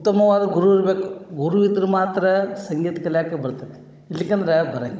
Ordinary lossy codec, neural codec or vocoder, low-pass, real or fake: none; codec, 16 kHz, 16 kbps, FunCodec, trained on Chinese and English, 50 frames a second; none; fake